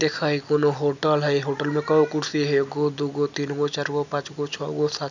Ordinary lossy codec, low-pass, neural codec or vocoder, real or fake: none; 7.2 kHz; none; real